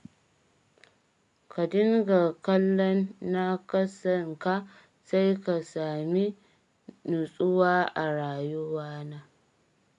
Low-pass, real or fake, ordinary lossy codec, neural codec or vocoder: 10.8 kHz; real; AAC, 64 kbps; none